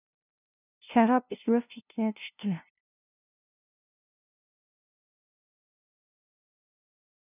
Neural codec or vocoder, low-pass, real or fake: codec, 16 kHz, 0.5 kbps, FunCodec, trained on LibriTTS, 25 frames a second; 3.6 kHz; fake